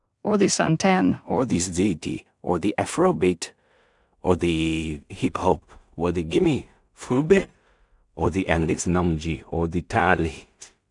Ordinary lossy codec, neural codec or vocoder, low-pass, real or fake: none; codec, 16 kHz in and 24 kHz out, 0.4 kbps, LongCat-Audio-Codec, two codebook decoder; 10.8 kHz; fake